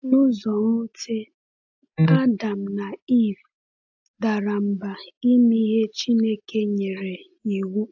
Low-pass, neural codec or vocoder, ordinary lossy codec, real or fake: 7.2 kHz; none; AAC, 48 kbps; real